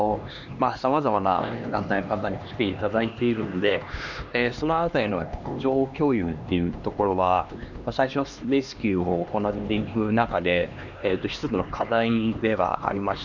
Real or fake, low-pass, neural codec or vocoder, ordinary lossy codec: fake; 7.2 kHz; codec, 16 kHz, 2 kbps, X-Codec, HuBERT features, trained on LibriSpeech; none